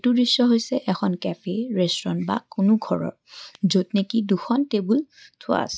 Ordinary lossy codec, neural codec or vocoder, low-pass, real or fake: none; none; none; real